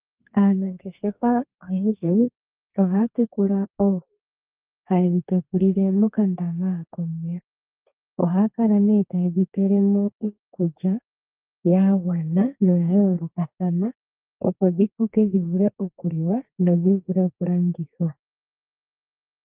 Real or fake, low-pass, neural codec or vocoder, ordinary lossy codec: fake; 3.6 kHz; codec, 32 kHz, 1.9 kbps, SNAC; Opus, 32 kbps